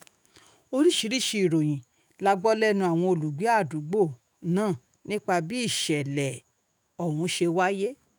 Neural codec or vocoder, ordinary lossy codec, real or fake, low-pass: autoencoder, 48 kHz, 128 numbers a frame, DAC-VAE, trained on Japanese speech; none; fake; none